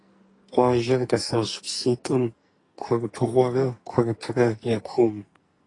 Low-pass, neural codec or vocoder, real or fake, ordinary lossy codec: 10.8 kHz; codec, 44.1 kHz, 2.6 kbps, SNAC; fake; AAC, 32 kbps